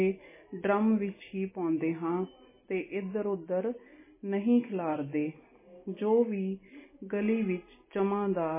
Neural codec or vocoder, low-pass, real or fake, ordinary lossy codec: none; 3.6 kHz; real; MP3, 16 kbps